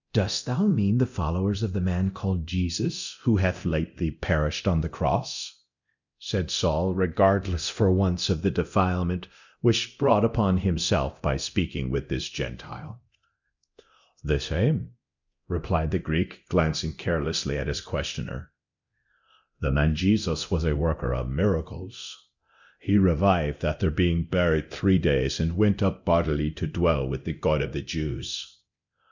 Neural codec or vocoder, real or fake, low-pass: codec, 24 kHz, 0.9 kbps, DualCodec; fake; 7.2 kHz